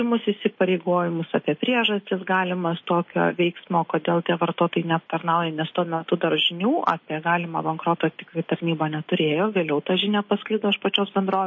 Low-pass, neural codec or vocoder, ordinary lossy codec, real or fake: 7.2 kHz; none; MP3, 32 kbps; real